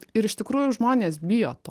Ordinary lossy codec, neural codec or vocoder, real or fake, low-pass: Opus, 24 kbps; none; real; 14.4 kHz